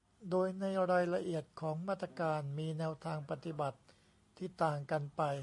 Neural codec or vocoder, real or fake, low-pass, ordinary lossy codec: none; real; 10.8 kHz; MP3, 64 kbps